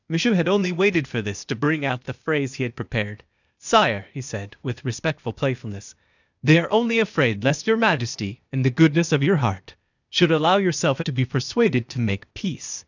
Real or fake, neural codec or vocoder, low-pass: fake; codec, 16 kHz, 0.8 kbps, ZipCodec; 7.2 kHz